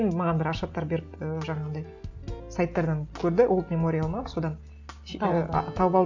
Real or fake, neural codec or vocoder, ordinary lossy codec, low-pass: real; none; none; 7.2 kHz